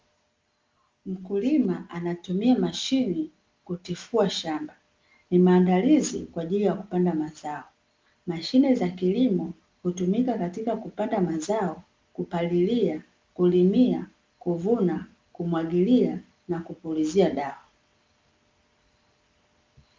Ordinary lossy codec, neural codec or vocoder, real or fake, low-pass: Opus, 32 kbps; none; real; 7.2 kHz